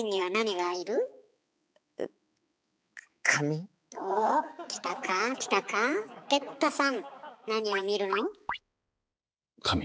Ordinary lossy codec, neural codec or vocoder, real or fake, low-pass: none; codec, 16 kHz, 4 kbps, X-Codec, HuBERT features, trained on balanced general audio; fake; none